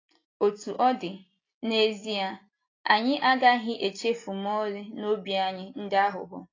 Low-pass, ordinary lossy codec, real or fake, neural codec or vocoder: 7.2 kHz; AAC, 32 kbps; real; none